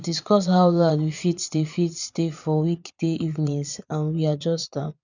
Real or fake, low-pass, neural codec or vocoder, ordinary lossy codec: fake; 7.2 kHz; vocoder, 22.05 kHz, 80 mel bands, Vocos; none